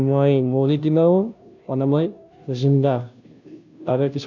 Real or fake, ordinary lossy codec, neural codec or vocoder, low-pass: fake; none; codec, 16 kHz, 0.5 kbps, FunCodec, trained on Chinese and English, 25 frames a second; 7.2 kHz